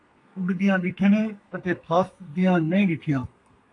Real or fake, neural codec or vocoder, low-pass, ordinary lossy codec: fake; codec, 32 kHz, 1.9 kbps, SNAC; 10.8 kHz; AAC, 48 kbps